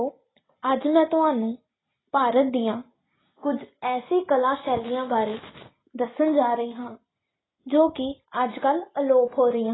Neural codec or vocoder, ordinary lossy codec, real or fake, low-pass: none; AAC, 16 kbps; real; 7.2 kHz